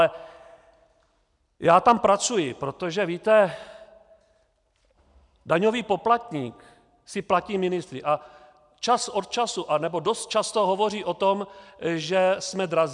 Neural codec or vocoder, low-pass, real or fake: none; 10.8 kHz; real